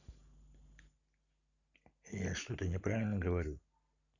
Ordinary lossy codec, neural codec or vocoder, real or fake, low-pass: none; none; real; 7.2 kHz